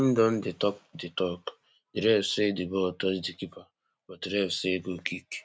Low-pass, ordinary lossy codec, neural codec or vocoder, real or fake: none; none; none; real